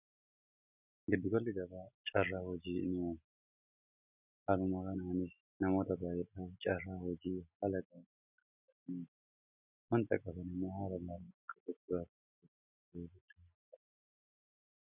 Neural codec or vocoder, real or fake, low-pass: none; real; 3.6 kHz